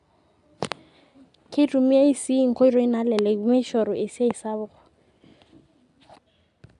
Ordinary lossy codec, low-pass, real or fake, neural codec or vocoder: none; 10.8 kHz; real; none